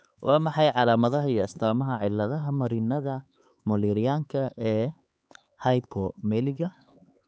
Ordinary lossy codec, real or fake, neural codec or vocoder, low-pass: none; fake; codec, 16 kHz, 4 kbps, X-Codec, HuBERT features, trained on LibriSpeech; none